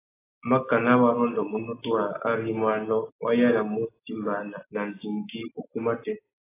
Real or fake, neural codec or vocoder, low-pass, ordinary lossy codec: real; none; 3.6 kHz; AAC, 16 kbps